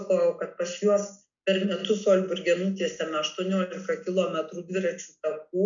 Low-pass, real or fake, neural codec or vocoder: 7.2 kHz; real; none